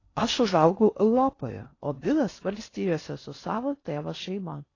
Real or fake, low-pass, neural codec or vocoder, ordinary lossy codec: fake; 7.2 kHz; codec, 16 kHz in and 24 kHz out, 0.6 kbps, FocalCodec, streaming, 4096 codes; AAC, 32 kbps